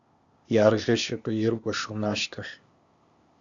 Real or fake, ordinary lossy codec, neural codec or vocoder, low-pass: fake; Opus, 64 kbps; codec, 16 kHz, 0.8 kbps, ZipCodec; 7.2 kHz